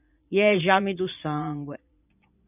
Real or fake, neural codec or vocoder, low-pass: fake; vocoder, 22.05 kHz, 80 mel bands, Vocos; 3.6 kHz